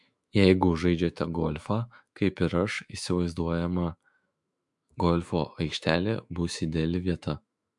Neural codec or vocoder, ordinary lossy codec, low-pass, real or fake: autoencoder, 48 kHz, 128 numbers a frame, DAC-VAE, trained on Japanese speech; MP3, 64 kbps; 10.8 kHz; fake